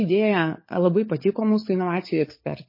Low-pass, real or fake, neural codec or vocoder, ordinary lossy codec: 5.4 kHz; fake; codec, 16 kHz, 16 kbps, FunCodec, trained on LibriTTS, 50 frames a second; MP3, 24 kbps